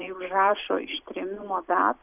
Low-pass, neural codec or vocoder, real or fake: 3.6 kHz; vocoder, 44.1 kHz, 128 mel bands every 256 samples, BigVGAN v2; fake